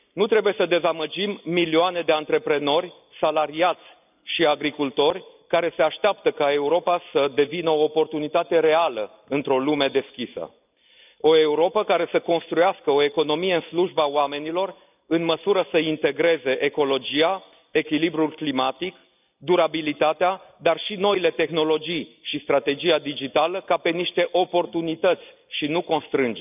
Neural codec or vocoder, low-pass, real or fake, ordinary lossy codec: none; 3.6 kHz; real; none